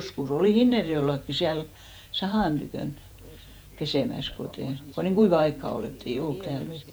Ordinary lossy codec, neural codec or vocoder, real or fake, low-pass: none; vocoder, 48 kHz, 128 mel bands, Vocos; fake; none